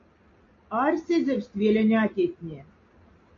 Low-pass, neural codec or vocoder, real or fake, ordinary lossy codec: 7.2 kHz; none; real; AAC, 48 kbps